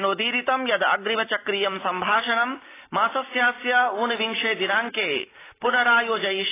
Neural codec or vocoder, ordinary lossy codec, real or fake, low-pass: none; AAC, 16 kbps; real; 3.6 kHz